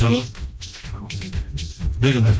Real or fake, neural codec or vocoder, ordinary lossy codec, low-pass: fake; codec, 16 kHz, 1 kbps, FreqCodec, smaller model; none; none